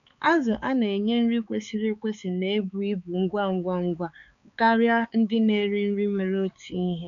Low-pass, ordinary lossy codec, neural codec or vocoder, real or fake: 7.2 kHz; none; codec, 16 kHz, 4 kbps, X-Codec, HuBERT features, trained on balanced general audio; fake